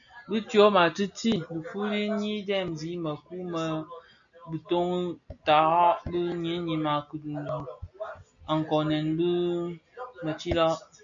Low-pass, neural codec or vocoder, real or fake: 7.2 kHz; none; real